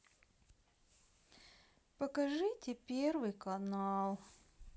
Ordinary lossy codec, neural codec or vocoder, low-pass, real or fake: none; none; none; real